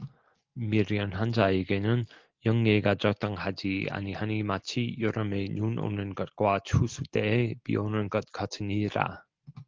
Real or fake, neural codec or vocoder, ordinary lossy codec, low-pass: real; none; Opus, 16 kbps; 7.2 kHz